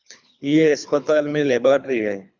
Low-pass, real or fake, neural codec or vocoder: 7.2 kHz; fake; codec, 24 kHz, 3 kbps, HILCodec